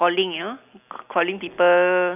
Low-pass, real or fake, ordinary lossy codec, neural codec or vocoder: 3.6 kHz; real; none; none